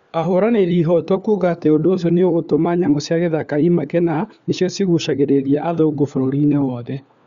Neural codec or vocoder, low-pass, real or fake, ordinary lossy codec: codec, 16 kHz, 4 kbps, FunCodec, trained on LibriTTS, 50 frames a second; 7.2 kHz; fake; Opus, 64 kbps